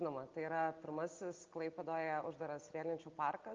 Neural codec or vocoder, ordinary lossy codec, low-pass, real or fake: none; Opus, 24 kbps; 7.2 kHz; real